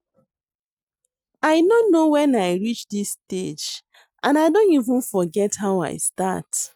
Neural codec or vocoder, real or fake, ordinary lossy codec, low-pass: none; real; none; 19.8 kHz